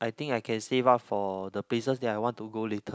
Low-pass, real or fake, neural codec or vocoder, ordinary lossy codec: none; real; none; none